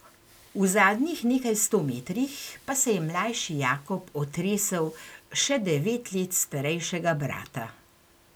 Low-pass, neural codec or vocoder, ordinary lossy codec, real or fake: none; none; none; real